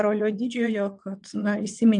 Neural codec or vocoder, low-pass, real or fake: vocoder, 22.05 kHz, 80 mel bands, WaveNeXt; 9.9 kHz; fake